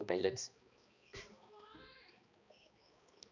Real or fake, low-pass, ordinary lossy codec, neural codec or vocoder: fake; 7.2 kHz; none; codec, 16 kHz, 2 kbps, X-Codec, HuBERT features, trained on balanced general audio